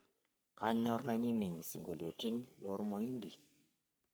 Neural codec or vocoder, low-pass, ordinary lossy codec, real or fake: codec, 44.1 kHz, 3.4 kbps, Pupu-Codec; none; none; fake